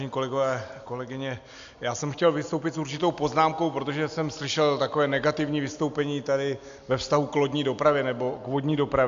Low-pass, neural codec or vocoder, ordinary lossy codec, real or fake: 7.2 kHz; none; AAC, 64 kbps; real